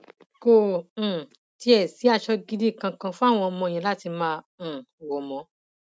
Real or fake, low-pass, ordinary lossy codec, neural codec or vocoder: real; none; none; none